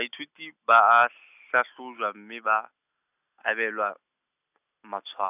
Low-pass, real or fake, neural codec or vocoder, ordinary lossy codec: 3.6 kHz; real; none; none